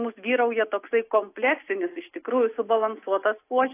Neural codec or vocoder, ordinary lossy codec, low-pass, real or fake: none; AAC, 24 kbps; 3.6 kHz; real